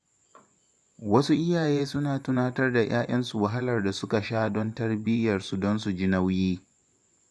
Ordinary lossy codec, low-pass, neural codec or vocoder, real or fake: none; none; vocoder, 24 kHz, 100 mel bands, Vocos; fake